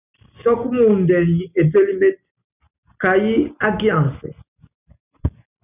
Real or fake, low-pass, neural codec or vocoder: real; 3.6 kHz; none